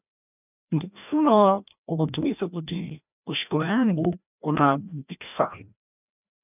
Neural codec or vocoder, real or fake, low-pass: codec, 16 kHz, 1 kbps, FreqCodec, larger model; fake; 3.6 kHz